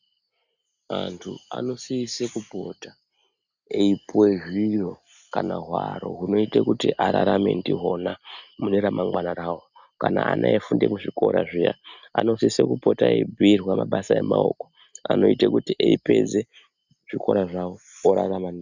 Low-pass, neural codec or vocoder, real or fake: 7.2 kHz; none; real